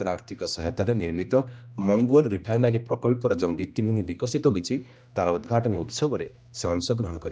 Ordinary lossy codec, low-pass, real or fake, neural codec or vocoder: none; none; fake; codec, 16 kHz, 1 kbps, X-Codec, HuBERT features, trained on general audio